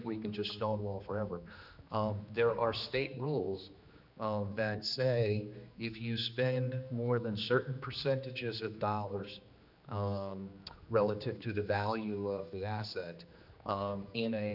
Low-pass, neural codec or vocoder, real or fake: 5.4 kHz; codec, 16 kHz, 2 kbps, X-Codec, HuBERT features, trained on general audio; fake